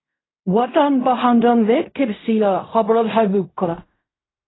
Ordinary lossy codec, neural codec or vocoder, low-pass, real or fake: AAC, 16 kbps; codec, 16 kHz in and 24 kHz out, 0.4 kbps, LongCat-Audio-Codec, fine tuned four codebook decoder; 7.2 kHz; fake